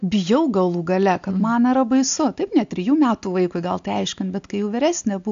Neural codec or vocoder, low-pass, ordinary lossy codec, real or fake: none; 7.2 kHz; AAC, 64 kbps; real